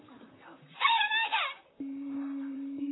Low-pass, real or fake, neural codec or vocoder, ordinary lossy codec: 7.2 kHz; fake; vocoder, 44.1 kHz, 128 mel bands, Pupu-Vocoder; AAC, 16 kbps